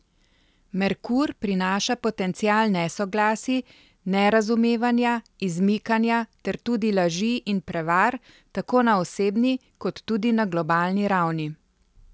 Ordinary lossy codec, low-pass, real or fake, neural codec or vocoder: none; none; real; none